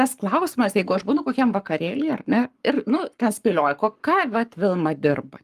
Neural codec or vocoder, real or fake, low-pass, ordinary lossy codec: codec, 44.1 kHz, 7.8 kbps, Pupu-Codec; fake; 14.4 kHz; Opus, 32 kbps